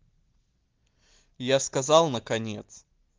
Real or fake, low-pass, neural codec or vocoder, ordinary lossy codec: real; 7.2 kHz; none; Opus, 16 kbps